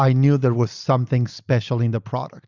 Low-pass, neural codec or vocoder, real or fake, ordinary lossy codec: 7.2 kHz; none; real; Opus, 64 kbps